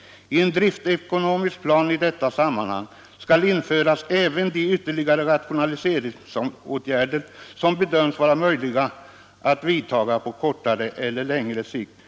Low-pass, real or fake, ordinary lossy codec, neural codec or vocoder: none; real; none; none